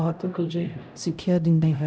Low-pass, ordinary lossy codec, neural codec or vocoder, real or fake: none; none; codec, 16 kHz, 0.5 kbps, X-Codec, HuBERT features, trained on LibriSpeech; fake